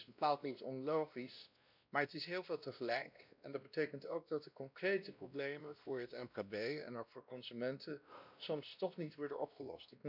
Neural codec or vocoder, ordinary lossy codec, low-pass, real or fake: codec, 16 kHz, 1 kbps, X-Codec, WavLM features, trained on Multilingual LibriSpeech; none; 5.4 kHz; fake